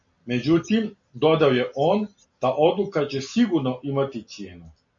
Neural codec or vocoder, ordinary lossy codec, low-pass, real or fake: none; AAC, 64 kbps; 7.2 kHz; real